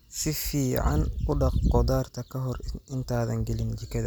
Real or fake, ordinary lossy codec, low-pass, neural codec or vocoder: real; none; none; none